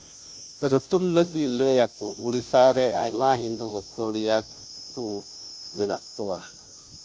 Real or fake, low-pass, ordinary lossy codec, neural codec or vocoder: fake; none; none; codec, 16 kHz, 0.5 kbps, FunCodec, trained on Chinese and English, 25 frames a second